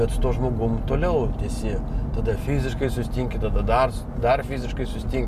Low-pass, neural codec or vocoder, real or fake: 14.4 kHz; none; real